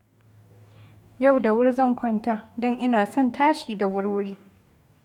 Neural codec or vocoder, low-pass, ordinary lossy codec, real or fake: codec, 44.1 kHz, 2.6 kbps, DAC; 19.8 kHz; none; fake